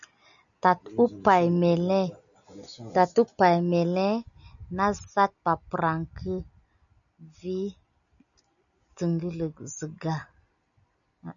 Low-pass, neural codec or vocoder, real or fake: 7.2 kHz; none; real